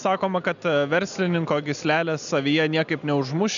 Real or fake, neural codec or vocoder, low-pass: real; none; 7.2 kHz